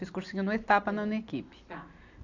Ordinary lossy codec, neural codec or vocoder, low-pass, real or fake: AAC, 48 kbps; none; 7.2 kHz; real